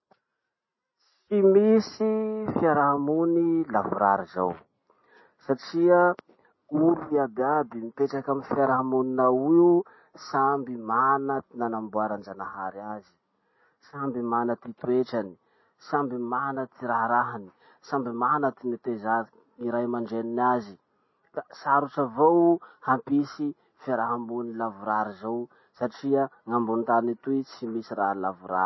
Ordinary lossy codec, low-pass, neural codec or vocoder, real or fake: MP3, 24 kbps; 7.2 kHz; none; real